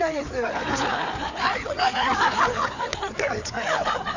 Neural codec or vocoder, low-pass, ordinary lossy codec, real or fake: codec, 16 kHz, 4 kbps, FreqCodec, larger model; 7.2 kHz; none; fake